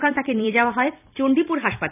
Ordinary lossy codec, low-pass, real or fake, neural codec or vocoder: none; 3.6 kHz; fake; vocoder, 44.1 kHz, 128 mel bands every 256 samples, BigVGAN v2